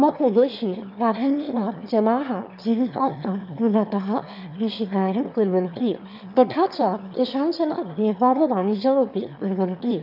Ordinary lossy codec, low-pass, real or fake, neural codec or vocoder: none; 5.4 kHz; fake; autoencoder, 22.05 kHz, a latent of 192 numbers a frame, VITS, trained on one speaker